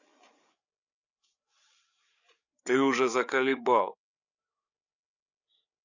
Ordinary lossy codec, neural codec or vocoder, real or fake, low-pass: none; codec, 16 kHz, 8 kbps, FreqCodec, larger model; fake; 7.2 kHz